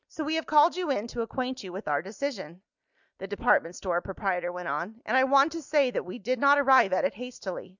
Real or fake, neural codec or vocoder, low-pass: real; none; 7.2 kHz